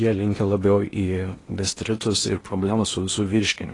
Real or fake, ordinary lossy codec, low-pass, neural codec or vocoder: fake; AAC, 32 kbps; 10.8 kHz; codec, 16 kHz in and 24 kHz out, 0.8 kbps, FocalCodec, streaming, 65536 codes